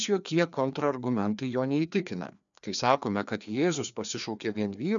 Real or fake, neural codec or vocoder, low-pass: fake; codec, 16 kHz, 2 kbps, FreqCodec, larger model; 7.2 kHz